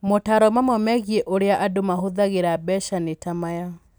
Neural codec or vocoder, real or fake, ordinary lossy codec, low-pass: none; real; none; none